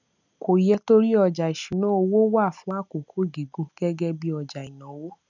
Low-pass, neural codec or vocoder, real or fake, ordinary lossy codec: 7.2 kHz; none; real; none